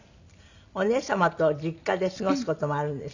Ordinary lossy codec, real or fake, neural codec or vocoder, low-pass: none; real; none; 7.2 kHz